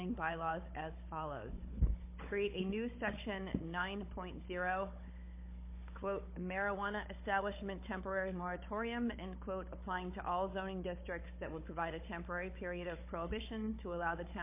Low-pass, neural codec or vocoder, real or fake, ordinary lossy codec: 3.6 kHz; codec, 16 kHz, 16 kbps, FunCodec, trained on Chinese and English, 50 frames a second; fake; MP3, 24 kbps